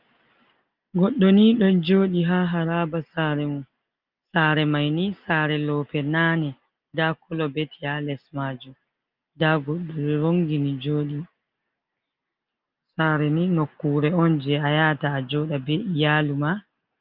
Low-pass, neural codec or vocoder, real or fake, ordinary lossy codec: 5.4 kHz; none; real; Opus, 24 kbps